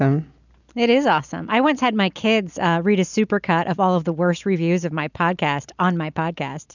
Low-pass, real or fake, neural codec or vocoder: 7.2 kHz; real; none